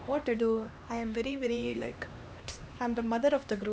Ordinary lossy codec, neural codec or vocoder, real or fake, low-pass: none; codec, 16 kHz, 2 kbps, X-Codec, HuBERT features, trained on LibriSpeech; fake; none